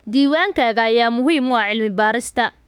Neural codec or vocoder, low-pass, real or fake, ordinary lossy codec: autoencoder, 48 kHz, 32 numbers a frame, DAC-VAE, trained on Japanese speech; 19.8 kHz; fake; none